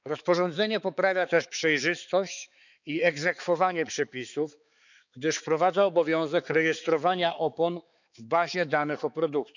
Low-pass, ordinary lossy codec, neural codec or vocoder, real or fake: 7.2 kHz; none; codec, 16 kHz, 4 kbps, X-Codec, HuBERT features, trained on balanced general audio; fake